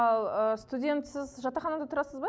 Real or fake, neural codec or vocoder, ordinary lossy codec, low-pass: real; none; none; none